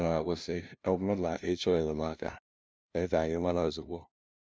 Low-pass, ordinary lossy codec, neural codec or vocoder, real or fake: none; none; codec, 16 kHz, 0.5 kbps, FunCodec, trained on LibriTTS, 25 frames a second; fake